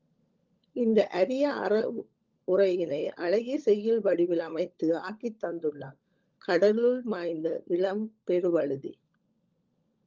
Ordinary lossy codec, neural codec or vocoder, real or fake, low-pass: Opus, 24 kbps; codec, 16 kHz, 16 kbps, FunCodec, trained on LibriTTS, 50 frames a second; fake; 7.2 kHz